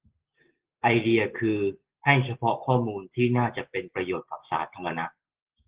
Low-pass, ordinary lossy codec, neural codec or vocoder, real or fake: 3.6 kHz; Opus, 16 kbps; none; real